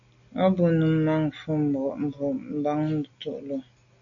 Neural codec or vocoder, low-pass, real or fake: none; 7.2 kHz; real